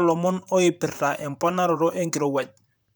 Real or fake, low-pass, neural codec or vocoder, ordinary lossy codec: fake; none; vocoder, 44.1 kHz, 128 mel bands, Pupu-Vocoder; none